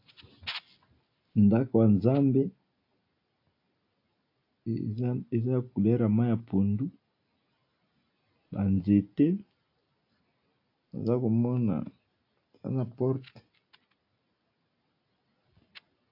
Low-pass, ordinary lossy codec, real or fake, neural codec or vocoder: 5.4 kHz; AAC, 32 kbps; real; none